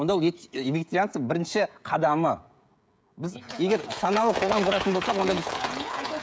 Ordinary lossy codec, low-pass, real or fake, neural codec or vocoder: none; none; fake; codec, 16 kHz, 16 kbps, FreqCodec, smaller model